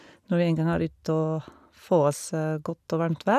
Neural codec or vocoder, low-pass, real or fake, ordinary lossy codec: none; 14.4 kHz; real; none